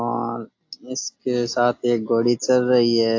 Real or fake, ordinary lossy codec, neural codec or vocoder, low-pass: real; AAC, 48 kbps; none; 7.2 kHz